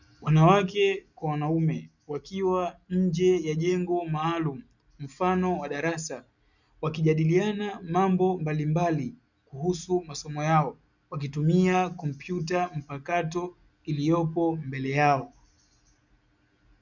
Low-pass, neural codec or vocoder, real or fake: 7.2 kHz; none; real